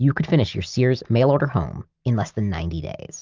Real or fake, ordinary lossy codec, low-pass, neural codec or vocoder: real; Opus, 24 kbps; 7.2 kHz; none